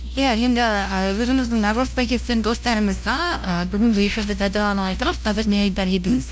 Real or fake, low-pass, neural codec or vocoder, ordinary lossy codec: fake; none; codec, 16 kHz, 0.5 kbps, FunCodec, trained on LibriTTS, 25 frames a second; none